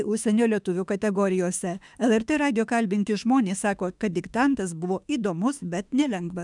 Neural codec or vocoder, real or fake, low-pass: autoencoder, 48 kHz, 32 numbers a frame, DAC-VAE, trained on Japanese speech; fake; 10.8 kHz